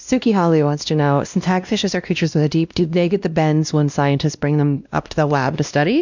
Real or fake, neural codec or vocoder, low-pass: fake; codec, 16 kHz, 1 kbps, X-Codec, WavLM features, trained on Multilingual LibriSpeech; 7.2 kHz